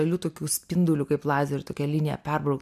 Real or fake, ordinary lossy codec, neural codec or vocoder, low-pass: real; MP3, 96 kbps; none; 14.4 kHz